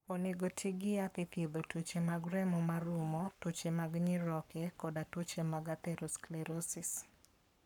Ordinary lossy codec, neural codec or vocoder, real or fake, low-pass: none; codec, 44.1 kHz, 7.8 kbps, Pupu-Codec; fake; 19.8 kHz